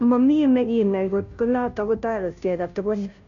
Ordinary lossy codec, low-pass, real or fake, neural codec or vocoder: none; 7.2 kHz; fake; codec, 16 kHz, 0.5 kbps, FunCodec, trained on Chinese and English, 25 frames a second